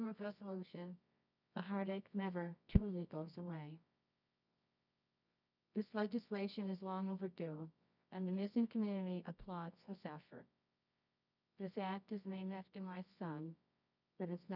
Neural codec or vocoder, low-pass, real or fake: codec, 24 kHz, 0.9 kbps, WavTokenizer, medium music audio release; 5.4 kHz; fake